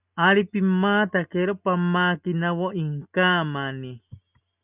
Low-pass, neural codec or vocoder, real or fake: 3.6 kHz; none; real